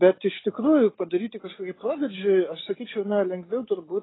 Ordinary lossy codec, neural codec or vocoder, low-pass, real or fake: AAC, 16 kbps; none; 7.2 kHz; real